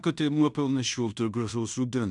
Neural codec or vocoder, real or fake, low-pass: codec, 16 kHz in and 24 kHz out, 0.9 kbps, LongCat-Audio-Codec, fine tuned four codebook decoder; fake; 10.8 kHz